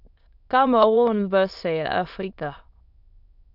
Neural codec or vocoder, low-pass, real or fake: autoencoder, 22.05 kHz, a latent of 192 numbers a frame, VITS, trained on many speakers; 5.4 kHz; fake